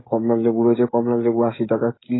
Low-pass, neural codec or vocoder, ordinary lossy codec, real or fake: 7.2 kHz; codec, 16 kHz, 16 kbps, FreqCodec, smaller model; AAC, 16 kbps; fake